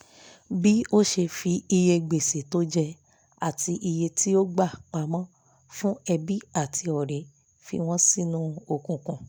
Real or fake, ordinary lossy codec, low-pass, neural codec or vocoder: real; none; none; none